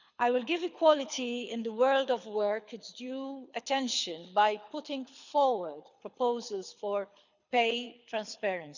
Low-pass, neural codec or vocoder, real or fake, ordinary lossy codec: 7.2 kHz; codec, 24 kHz, 6 kbps, HILCodec; fake; none